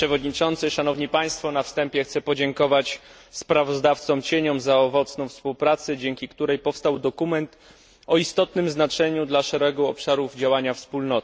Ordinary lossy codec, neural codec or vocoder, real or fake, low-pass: none; none; real; none